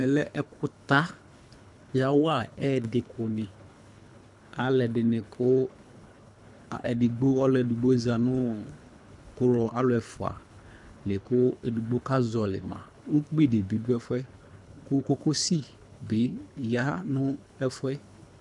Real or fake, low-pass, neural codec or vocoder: fake; 10.8 kHz; codec, 24 kHz, 3 kbps, HILCodec